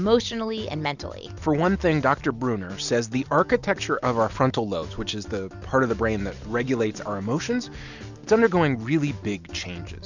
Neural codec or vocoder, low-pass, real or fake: none; 7.2 kHz; real